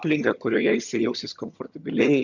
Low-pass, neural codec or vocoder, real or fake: 7.2 kHz; vocoder, 22.05 kHz, 80 mel bands, HiFi-GAN; fake